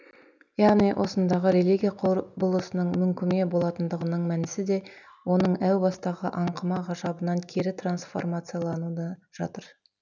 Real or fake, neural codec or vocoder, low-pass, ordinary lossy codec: real; none; 7.2 kHz; none